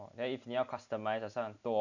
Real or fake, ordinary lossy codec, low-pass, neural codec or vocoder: real; none; 7.2 kHz; none